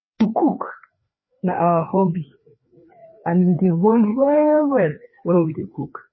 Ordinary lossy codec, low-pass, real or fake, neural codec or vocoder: MP3, 24 kbps; 7.2 kHz; fake; codec, 16 kHz, 2 kbps, FreqCodec, larger model